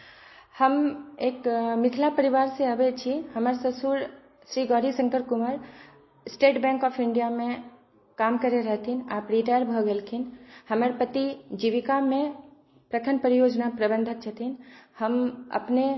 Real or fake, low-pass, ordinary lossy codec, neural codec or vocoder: real; 7.2 kHz; MP3, 24 kbps; none